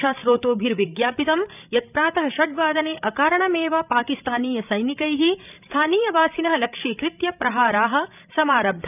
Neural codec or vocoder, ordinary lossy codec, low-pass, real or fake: codec, 16 kHz, 16 kbps, FreqCodec, larger model; none; 3.6 kHz; fake